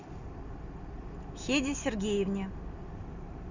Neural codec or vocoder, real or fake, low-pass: none; real; 7.2 kHz